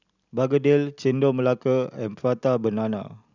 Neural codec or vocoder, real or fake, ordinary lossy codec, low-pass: none; real; none; 7.2 kHz